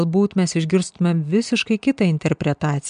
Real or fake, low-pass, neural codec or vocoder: real; 9.9 kHz; none